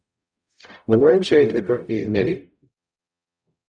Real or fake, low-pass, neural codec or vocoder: fake; 9.9 kHz; codec, 44.1 kHz, 0.9 kbps, DAC